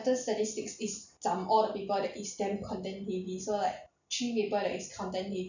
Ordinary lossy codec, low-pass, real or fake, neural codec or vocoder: none; 7.2 kHz; real; none